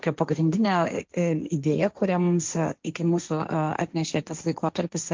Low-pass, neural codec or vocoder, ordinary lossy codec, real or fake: 7.2 kHz; codec, 16 kHz, 1.1 kbps, Voila-Tokenizer; Opus, 32 kbps; fake